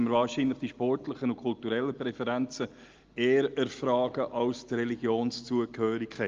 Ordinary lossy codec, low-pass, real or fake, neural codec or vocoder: Opus, 32 kbps; 7.2 kHz; real; none